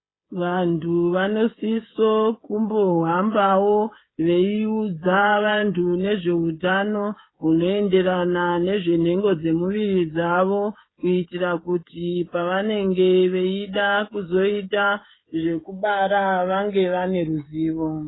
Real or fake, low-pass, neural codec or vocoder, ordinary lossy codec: fake; 7.2 kHz; codec, 16 kHz, 16 kbps, FreqCodec, smaller model; AAC, 16 kbps